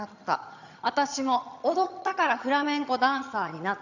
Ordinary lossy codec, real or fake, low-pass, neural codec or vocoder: none; fake; 7.2 kHz; vocoder, 22.05 kHz, 80 mel bands, HiFi-GAN